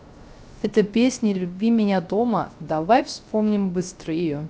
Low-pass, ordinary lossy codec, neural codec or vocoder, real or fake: none; none; codec, 16 kHz, 0.3 kbps, FocalCodec; fake